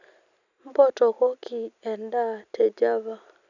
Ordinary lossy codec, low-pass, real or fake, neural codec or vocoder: none; 7.2 kHz; real; none